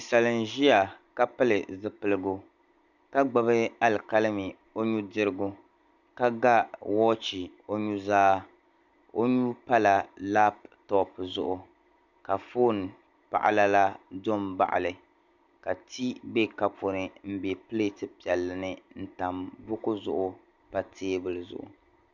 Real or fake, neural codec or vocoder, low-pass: real; none; 7.2 kHz